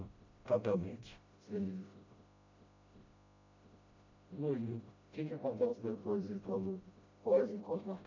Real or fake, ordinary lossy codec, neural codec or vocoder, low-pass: fake; MP3, 48 kbps; codec, 16 kHz, 0.5 kbps, FreqCodec, smaller model; 7.2 kHz